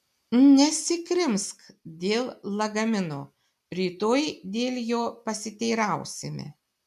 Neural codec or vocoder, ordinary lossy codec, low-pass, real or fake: none; MP3, 96 kbps; 14.4 kHz; real